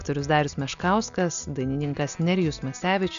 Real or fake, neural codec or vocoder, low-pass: real; none; 7.2 kHz